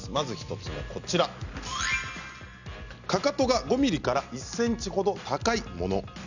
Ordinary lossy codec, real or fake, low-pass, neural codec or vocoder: none; real; 7.2 kHz; none